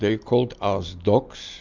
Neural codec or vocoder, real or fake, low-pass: none; real; 7.2 kHz